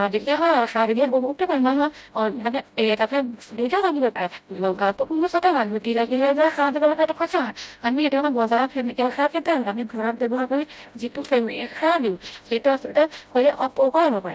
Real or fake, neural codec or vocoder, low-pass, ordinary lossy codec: fake; codec, 16 kHz, 0.5 kbps, FreqCodec, smaller model; none; none